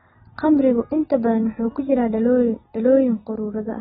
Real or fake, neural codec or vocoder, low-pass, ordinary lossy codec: real; none; 19.8 kHz; AAC, 16 kbps